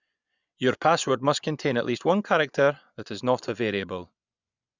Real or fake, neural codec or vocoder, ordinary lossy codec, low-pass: fake; vocoder, 24 kHz, 100 mel bands, Vocos; none; 7.2 kHz